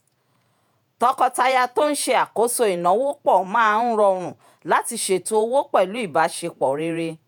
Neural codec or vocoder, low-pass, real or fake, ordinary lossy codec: vocoder, 48 kHz, 128 mel bands, Vocos; none; fake; none